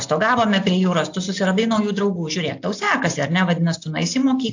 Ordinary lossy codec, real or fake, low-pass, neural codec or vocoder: AAC, 48 kbps; real; 7.2 kHz; none